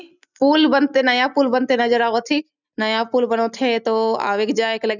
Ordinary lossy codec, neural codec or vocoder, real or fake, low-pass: none; none; real; 7.2 kHz